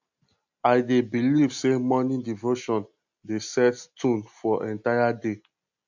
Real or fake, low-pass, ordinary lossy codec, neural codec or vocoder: real; 7.2 kHz; MP3, 64 kbps; none